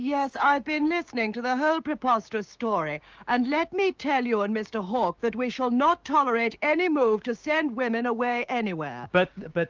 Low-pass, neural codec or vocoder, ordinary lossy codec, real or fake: 7.2 kHz; codec, 16 kHz, 6 kbps, DAC; Opus, 24 kbps; fake